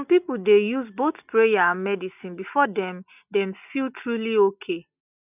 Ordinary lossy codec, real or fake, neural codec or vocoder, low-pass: none; real; none; 3.6 kHz